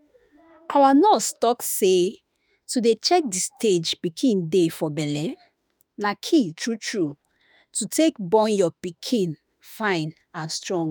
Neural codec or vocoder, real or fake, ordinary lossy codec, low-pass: autoencoder, 48 kHz, 32 numbers a frame, DAC-VAE, trained on Japanese speech; fake; none; none